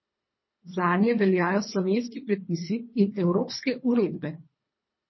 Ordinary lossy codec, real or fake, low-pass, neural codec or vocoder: MP3, 24 kbps; fake; 7.2 kHz; codec, 24 kHz, 3 kbps, HILCodec